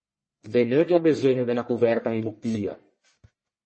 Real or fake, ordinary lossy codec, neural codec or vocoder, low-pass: fake; MP3, 32 kbps; codec, 44.1 kHz, 1.7 kbps, Pupu-Codec; 9.9 kHz